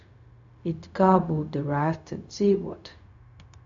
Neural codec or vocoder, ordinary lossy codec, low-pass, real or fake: codec, 16 kHz, 0.4 kbps, LongCat-Audio-Codec; AAC, 64 kbps; 7.2 kHz; fake